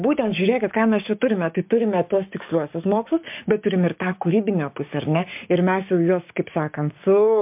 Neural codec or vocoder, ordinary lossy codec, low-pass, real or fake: codec, 16 kHz, 6 kbps, DAC; MP3, 32 kbps; 3.6 kHz; fake